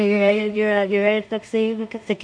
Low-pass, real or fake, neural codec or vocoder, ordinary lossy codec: 9.9 kHz; fake; codec, 16 kHz in and 24 kHz out, 0.4 kbps, LongCat-Audio-Codec, two codebook decoder; MP3, 64 kbps